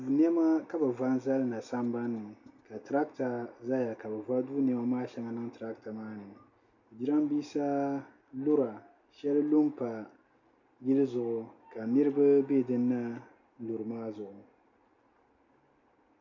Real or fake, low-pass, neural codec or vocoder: real; 7.2 kHz; none